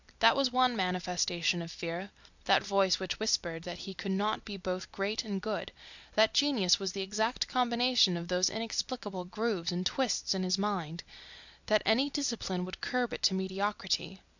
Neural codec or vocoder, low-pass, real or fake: vocoder, 44.1 kHz, 128 mel bands every 256 samples, BigVGAN v2; 7.2 kHz; fake